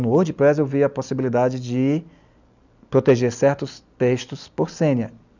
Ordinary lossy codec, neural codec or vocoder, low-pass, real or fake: none; none; 7.2 kHz; real